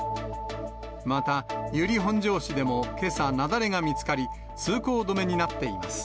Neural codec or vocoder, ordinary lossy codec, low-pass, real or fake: none; none; none; real